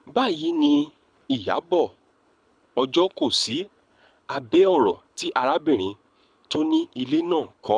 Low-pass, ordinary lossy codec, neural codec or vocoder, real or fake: 9.9 kHz; none; codec, 24 kHz, 6 kbps, HILCodec; fake